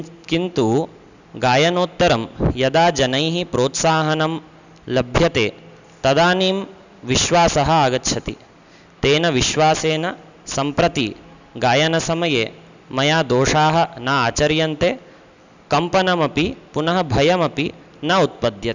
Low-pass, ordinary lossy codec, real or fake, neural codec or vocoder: 7.2 kHz; none; real; none